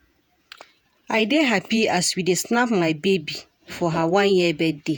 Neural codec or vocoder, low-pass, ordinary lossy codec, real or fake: vocoder, 48 kHz, 128 mel bands, Vocos; none; none; fake